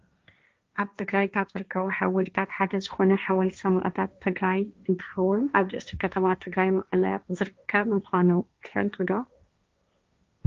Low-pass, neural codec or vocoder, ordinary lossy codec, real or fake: 7.2 kHz; codec, 16 kHz, 1.1 kbps, Voila-Tokenizer; Opus, 32 kbps; fake